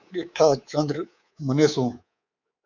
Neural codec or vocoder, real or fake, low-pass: codec, 24 kHz, 3.1 kbps, DualCodec; fake; 7.2 kHz